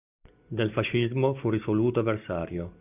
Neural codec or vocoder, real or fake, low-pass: none; real; 3.6 kHz